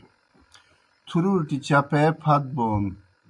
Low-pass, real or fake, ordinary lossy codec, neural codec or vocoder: 10.8 kHz; real; MP3, 96 kbps; none